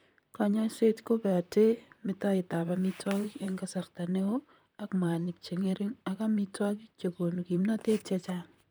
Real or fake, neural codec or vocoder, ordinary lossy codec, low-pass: fake; vocoder, 44.1 kHz, 128 mel bands, Pupu-Vocoder; none; none